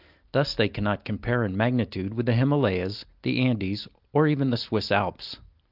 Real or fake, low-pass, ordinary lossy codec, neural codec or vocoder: real; 5.4 kHz; Opus, 24 kbps; none